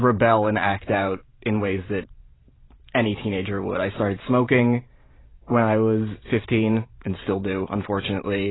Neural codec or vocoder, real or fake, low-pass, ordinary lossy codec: vocoder, 44.1 kHz, 128 mel bands every 512 samples, BigVGAN v2; fake; 7.2 kHz; AAC, 16 kbps